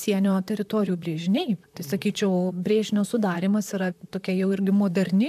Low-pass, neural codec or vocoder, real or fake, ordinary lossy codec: 14.4 kHz; vocoder, 44.1 kHz, 128 mel bands, Pupu-Vocoder; fake; MP3, 96 kbps